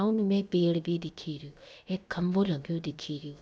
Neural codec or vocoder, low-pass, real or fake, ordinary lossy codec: codec, 16 kHz, about 1 kbps, DyCAST, with the encoder's durations; none; fake; none